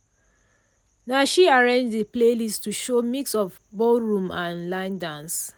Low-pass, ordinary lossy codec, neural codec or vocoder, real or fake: none; none; none; real